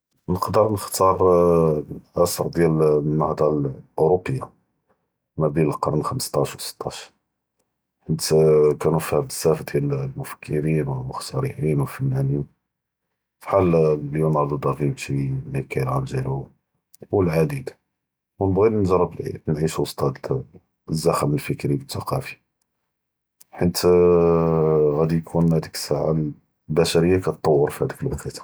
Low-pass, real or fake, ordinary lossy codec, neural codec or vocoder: none; real; none; none